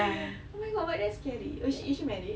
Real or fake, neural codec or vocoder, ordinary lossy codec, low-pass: real; none; none; none